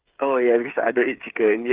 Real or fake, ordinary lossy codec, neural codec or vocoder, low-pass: fake; Opus, 32 kbps; codec, 16 kHz, 8 kbps, FreqCodec, smaller model; 3.6 kHz